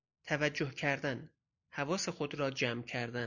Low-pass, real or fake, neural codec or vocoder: 7.2 kHz; real; none